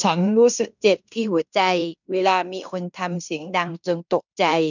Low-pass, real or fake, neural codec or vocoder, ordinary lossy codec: 7.2 kHz; fake; codec, 16 kHz in and 24 kHz out, 0.9 kbps, LongCat-Audio-Codec, fine tuned four codebook decoder; none